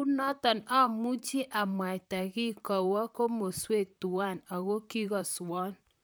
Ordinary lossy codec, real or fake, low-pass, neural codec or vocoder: none; fake; none; vocoder, 44.1 kHz, 128 mel bands, Pupu-Vocoder